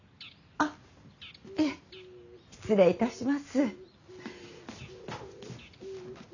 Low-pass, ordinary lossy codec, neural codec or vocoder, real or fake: 7.2 kHz; none; none; real